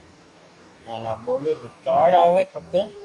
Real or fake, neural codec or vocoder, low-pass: fake; codec, 44.1 kHz, 2.6 kbps, DAC; 10.8 kHz